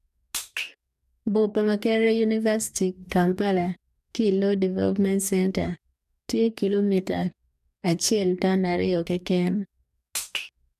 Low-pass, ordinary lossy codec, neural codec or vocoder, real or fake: 14.4 kHz; none; codec, 44.1 kHz, 2.6 kbps, DAC; fake